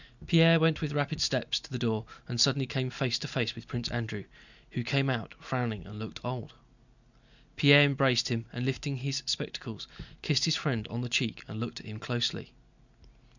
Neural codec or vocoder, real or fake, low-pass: none; real; 7.2 kHz